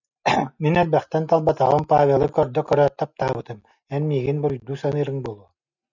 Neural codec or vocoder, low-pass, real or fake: none; 7.2 kHz; real